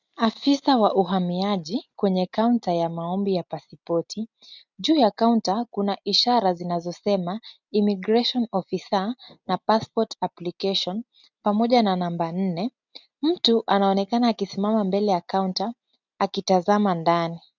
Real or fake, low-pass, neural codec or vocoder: real; 7.2 kHz; none